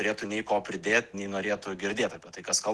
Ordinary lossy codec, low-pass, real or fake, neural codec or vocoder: Opus, 16 kbps; 10.8 kHz; real; none